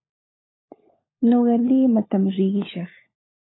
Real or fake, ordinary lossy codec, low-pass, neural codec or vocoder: fake; AAC, 16 kbps; 7.2 kHz; codec, 16 kHz, 16 kbps, FunCodec, trained on LibriTTS, 50 frames a second